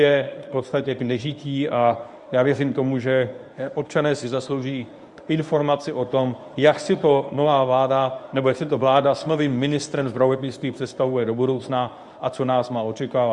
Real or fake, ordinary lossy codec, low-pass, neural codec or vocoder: fake; Opus, 64 kbps; 10.8 kHz; codec, 24 kHz, 0.9 kbps, WavTokenizer, medium speech release version 1